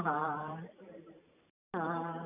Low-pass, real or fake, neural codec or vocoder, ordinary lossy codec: 3.6 kHz; fake; vocoder, 44.1 kHz, 128 mel bands every 512 samples, BigVGAN v2; none